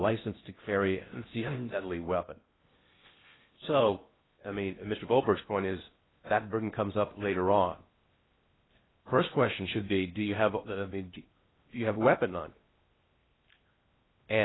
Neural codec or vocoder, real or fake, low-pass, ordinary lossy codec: codec, 16 kHz in and 24 kHz out, 0.6 kbps, FocalCodec, streaming, 4096 codes; fake; 7.2 kHz; AAC, 16 kbps